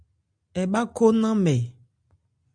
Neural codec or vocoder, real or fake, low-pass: none; real; 9.9 kHz